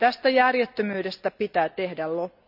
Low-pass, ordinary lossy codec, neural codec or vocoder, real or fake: 5.4 kHz; none; none; real